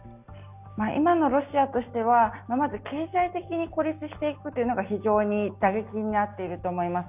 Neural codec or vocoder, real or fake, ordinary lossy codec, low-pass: none; real; none; 3.6 kHz